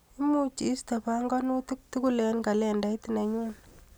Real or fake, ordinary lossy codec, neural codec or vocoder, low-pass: real; none; none; none